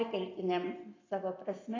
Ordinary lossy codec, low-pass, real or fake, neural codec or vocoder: AAC, 32 kbps; 7.2 kHz; real; none